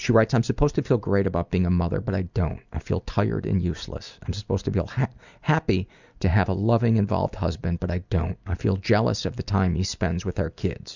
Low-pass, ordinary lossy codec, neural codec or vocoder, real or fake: 7.2 kHz; Opus, 64 kbps; none; real